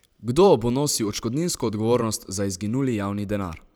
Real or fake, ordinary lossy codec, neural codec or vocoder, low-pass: fake; none; vocoder, 44.1 kHz, 128 mel bands every 512 samples, BigVGAN v2; none